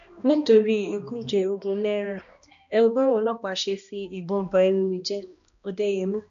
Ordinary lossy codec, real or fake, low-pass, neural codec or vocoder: none; fake; 7.2 kHz; codec, 16 kHz, 1 kbps, X-Codec, HuBERT features, trained on balanced general audio